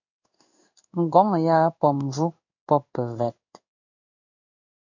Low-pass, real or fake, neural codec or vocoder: 7.2 kHz; fake; codec, 16 kHz in and 24 kHz out, 1 kbps, XY-Tokenizer